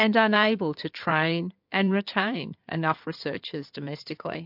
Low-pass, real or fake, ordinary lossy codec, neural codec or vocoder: 5.4 kHz; fake; MP3, 48 kbps; codec, 16 kHz in and 24 kHz out, 2.2 kbps, FireRedTTS-2 codec